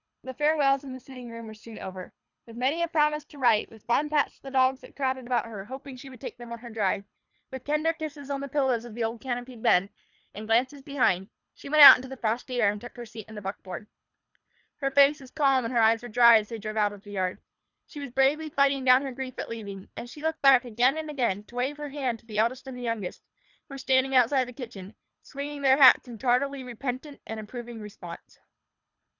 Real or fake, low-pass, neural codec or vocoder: fake; 7.2 kHz; codec, 24 kHz, 3 kbps, HILCodec